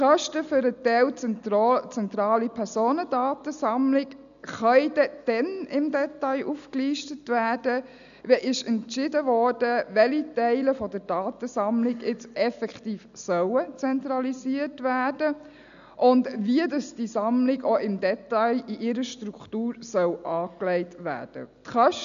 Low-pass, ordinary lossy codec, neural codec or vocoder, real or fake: 7.2 kHz; none; none; real